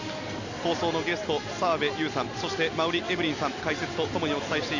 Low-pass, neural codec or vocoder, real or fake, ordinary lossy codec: 7.2 kHz; none; real; none